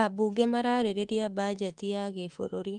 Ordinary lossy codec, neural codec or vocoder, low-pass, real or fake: Opus, 32 kbps; autoencoder, 48 kHz, 32 numbers a frame, DAC-VAE, trained on Japanese speech; 10.8 kHz; fake